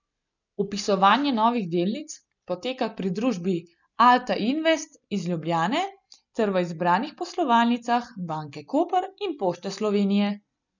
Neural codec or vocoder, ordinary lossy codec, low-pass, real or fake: none; none; 7.2 kHz; real